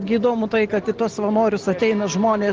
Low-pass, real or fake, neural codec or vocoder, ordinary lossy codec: 7.2 kHz; real; none; Opus, 16 kbps